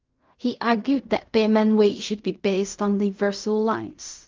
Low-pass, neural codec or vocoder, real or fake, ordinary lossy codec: 7.2 kHz; codec, 16 kHz in and 24 kHz out, 0.4 kbps, LongCat-Audio-Codec, fine tuned four codebook decoder; fake; Opus, 24 kbps